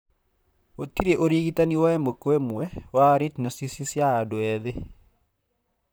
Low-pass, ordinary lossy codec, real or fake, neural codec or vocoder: none; none; fake; vocoder, 44.1 kHz, 128 mel bands, Pupu-Vocoder